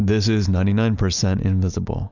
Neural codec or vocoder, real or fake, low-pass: none; real; 7.2 kHz